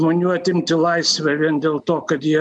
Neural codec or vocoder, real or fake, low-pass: none; real; 10.8 kHz